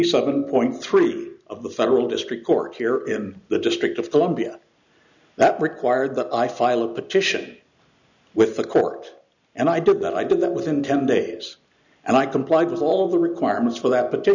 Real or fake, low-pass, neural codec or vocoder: real; 7.2 kHz; none